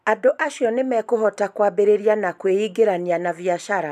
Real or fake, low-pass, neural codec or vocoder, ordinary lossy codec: real; 14.4 kHz; none; none